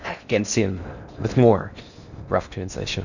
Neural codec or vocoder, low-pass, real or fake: codec, 16 kHz in and 24 kHz out, 0.6 kbps, FocalCodec, streaming, 4096 codes; 7.2 kHz; fake